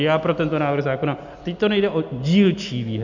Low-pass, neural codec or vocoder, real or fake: 7.2 kHz; none; real